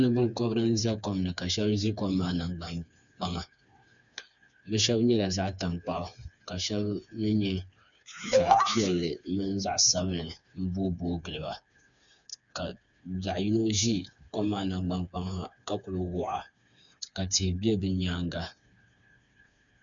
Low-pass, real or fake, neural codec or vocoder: 7.2 kHz; fake; codec, 16 kHz, 4 kbps, FreqCodec, smaller model